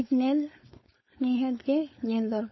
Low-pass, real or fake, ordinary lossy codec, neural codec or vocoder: 7.2 kHz; fake; MP3, 24 kbps; codec, 16 kHz, 4.8 kbps, FACodec